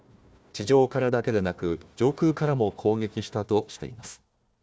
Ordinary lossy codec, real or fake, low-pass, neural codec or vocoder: none; fake; none; codec, 16 kHz, 1 kbps, FunCodec, trained on Chinese and English, 50 frames a second